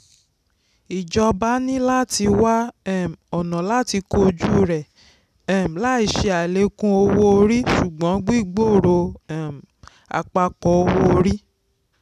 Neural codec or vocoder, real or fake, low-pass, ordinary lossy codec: none; real; 14.4 kHz; none